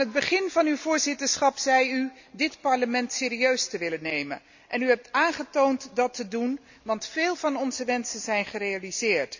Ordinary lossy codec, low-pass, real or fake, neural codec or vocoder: none; 7.2 kHz; real; none